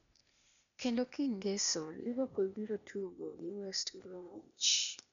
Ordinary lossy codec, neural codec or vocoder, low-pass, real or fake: none; codec, 16 kHz, 0.8 kbps, ZipCodec; 7.2 kHz; fake